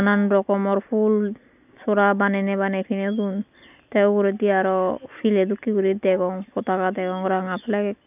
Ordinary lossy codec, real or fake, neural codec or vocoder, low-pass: none; real; none; 3.6 kHz